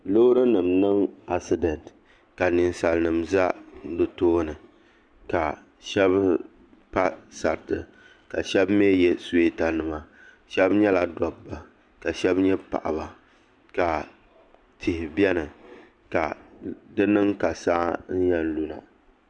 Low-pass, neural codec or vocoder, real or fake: 9.9 kHz; none; real